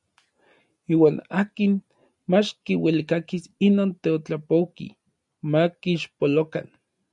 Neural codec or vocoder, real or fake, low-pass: none; real; 10.8 kHz